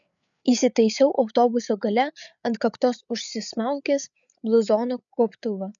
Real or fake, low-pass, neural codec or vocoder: fake; 7.2 kHz; codec, 16 kHz, 8 kbps, FreqCodec, larger model